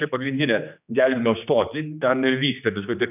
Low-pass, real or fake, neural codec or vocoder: 3.6 kHz; fake; codec, 16 kHz, 2 kbps, X-Codec, HuBERT features, trained on general audio